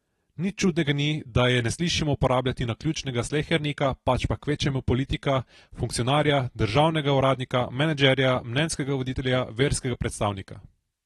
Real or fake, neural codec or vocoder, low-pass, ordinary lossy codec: real; none; 19.8 kHz; AAC, 32 kbps